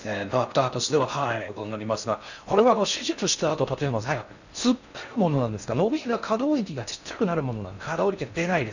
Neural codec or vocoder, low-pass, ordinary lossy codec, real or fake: codec, 16 kHz in and 24 kHz out, 0.6 kbps, FocalCodec, streaming, 4096 codes; 7.2 kHz; none; fake